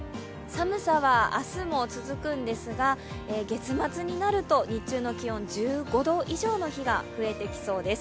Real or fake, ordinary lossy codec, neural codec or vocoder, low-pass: real; none; none; none